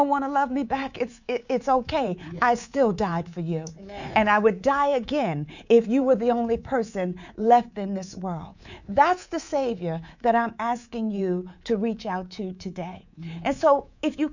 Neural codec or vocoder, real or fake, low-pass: codec, 24 kHz, 3.1 kbps, DualCodec; fake; 7.2 kHz